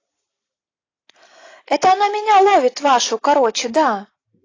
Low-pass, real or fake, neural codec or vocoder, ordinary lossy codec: 7.2 kHz; real; none; AAC, 32 kbps